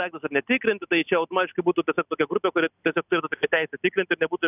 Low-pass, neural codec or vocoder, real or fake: 3.6 kHz; none; real